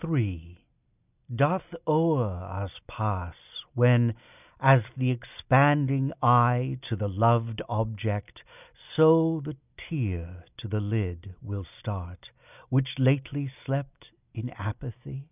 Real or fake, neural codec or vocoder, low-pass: real; none; 3.6 kHz